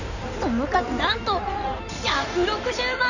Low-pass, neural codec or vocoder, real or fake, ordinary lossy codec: 7.2 kHz; codec, 16 kHz in and 24 kHz out, 2.2 kbps, FireRedTTS-2 codec; fake; none